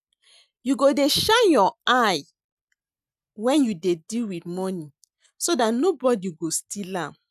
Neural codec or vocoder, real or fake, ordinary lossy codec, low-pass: none; real; none; 14.4 kHz